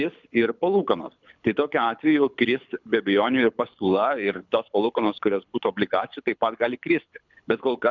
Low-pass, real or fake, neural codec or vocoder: 7.2 kHz; fake; codec, 24 kHz, 6 kbps, HILCodec